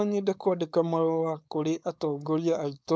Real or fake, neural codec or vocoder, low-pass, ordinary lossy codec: fake; codec, 16 kHz, 4.8 kbps, FACodec; none; none